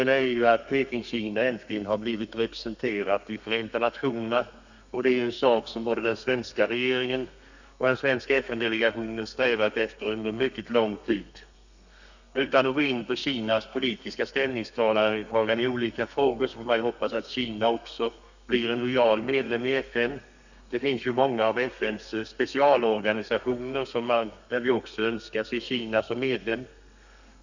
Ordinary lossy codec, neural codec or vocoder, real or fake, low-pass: none; codec, 32 kHz, 1.9 kbps, SNAC; fake; 7.2 kHz